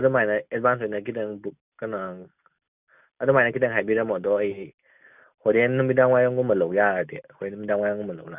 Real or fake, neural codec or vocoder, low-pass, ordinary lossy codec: real; none; 3.6 kHz; none